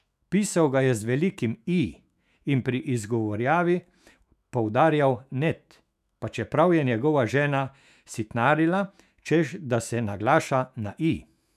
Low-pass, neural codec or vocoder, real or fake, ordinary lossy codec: 14.4 kHz; autoencoder, 48 kHz, 128 numbers a frame, DAC-VAE, trained on Japanese speech; fake; none